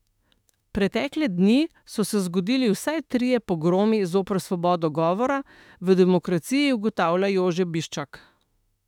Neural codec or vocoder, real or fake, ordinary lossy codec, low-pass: autoencoder, 48 kHz, 32 numbers a frame, DAC-VAE, trained on Japanese speech; fake; none; 19.8 kHz